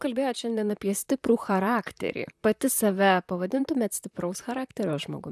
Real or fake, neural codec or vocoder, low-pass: fake; vocoder, 44.1 kHz, 128 mel bands, Pupu-Vocoder; 14.4 kHz